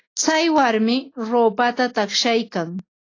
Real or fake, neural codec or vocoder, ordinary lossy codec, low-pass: real; none; AAC, 32 kbps; 7.2 kHz